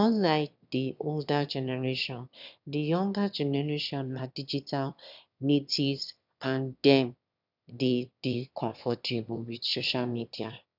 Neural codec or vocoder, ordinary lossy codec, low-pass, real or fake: autoencoder, 22.05 kHz, a latent of 192 numbers a frame, VITS, trained on one speaker; none; 5.4 kHz; fake